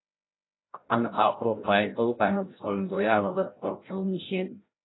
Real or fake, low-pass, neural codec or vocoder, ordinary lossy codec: fake; 7.2 kHz; codec, 16 kHz, 0.5 kbps, FreqCodec, larger model; AAC, 16 kbps